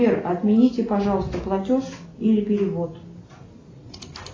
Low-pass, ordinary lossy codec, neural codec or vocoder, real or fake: 7.2 kHz; AAC, 32 kbps; none; real